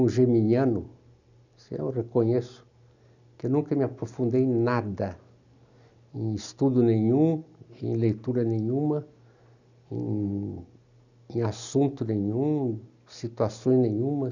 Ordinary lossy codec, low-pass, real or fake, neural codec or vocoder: none; 7.2 kHz; real; none